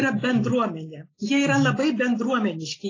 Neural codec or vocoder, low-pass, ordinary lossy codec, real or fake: none; 7.2 kHz; AAC, 32 kbps; real